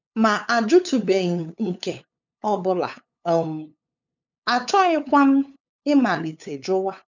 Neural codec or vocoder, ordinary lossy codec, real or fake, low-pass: codec, 16 kHz, 8 kbps, FunCodec, trained on LibriTTS, 25 frames a second; none; fake; 7.2 kHz